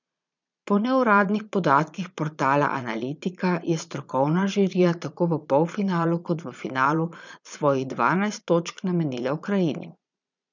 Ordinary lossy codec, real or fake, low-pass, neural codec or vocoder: none; fake; 7.2 kHz; vocoder, 22.05 kHz, 80 mel bands, Vocos